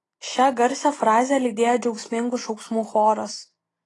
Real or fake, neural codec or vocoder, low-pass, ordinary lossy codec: real; none; 10.8 kHz; AAC, 32 kbps